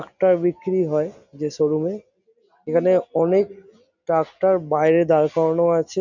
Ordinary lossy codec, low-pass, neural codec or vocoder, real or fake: none; 7.2 kHz; none; real